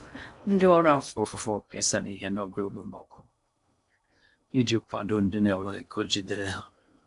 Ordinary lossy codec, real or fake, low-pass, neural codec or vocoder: none; fake; 10.8 kHz; codec, 16 kHz in and 24 kHz out, 0.6 kbps, FocalCodec, streaming, 2048 codes